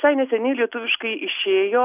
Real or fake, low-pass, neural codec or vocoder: real; 3.6 kHz; none